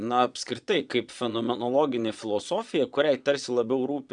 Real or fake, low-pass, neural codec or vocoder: fake; 9.9 kHz; vocoder, 22.05 kHz, 80 mel bands, Vocos